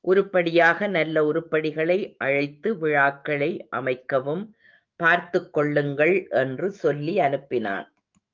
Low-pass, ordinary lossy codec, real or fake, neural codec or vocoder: 7.2 kHz; Opus, 24 kbps; fake; autoencoder, 48 kHz, 128 numbers a frame, DAC-VAE, trained on Japanese speech